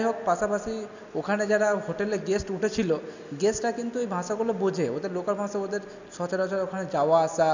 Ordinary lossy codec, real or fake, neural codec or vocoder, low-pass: none; real; none; 7.2 kHz